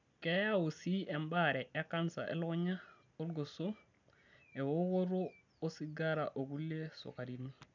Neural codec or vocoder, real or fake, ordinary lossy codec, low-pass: none; real; none; 7.2 kHz